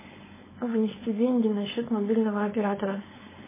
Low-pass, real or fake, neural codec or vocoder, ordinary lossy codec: 3.6 kHz; fake; codec, 16 kHz, 4.8 kbps, FACodec; MP3, 16 kbps